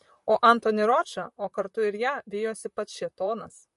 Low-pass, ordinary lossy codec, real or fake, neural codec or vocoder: 14.4 kHz; MP3, 48 kbps; fake; vocoder, 44.1 kHz, 128 mel bands, Pupu-Vocoder